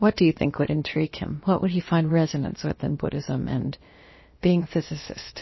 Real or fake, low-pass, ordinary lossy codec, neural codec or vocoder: fake; 7.2 kHz; MP3, 24 kbps; codec, 16 kHz, about 1 kbps, DyCAST, with the encoder's durations